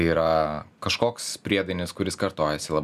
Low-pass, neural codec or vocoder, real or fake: 14.4 kHz; none; real